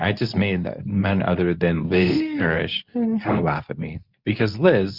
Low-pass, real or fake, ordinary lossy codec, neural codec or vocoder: 5.4 kHz; fake; Opus, 64 kbps; codec, 24 kHz, 0.9 kbps, WavTokenizer, medium speech release version 2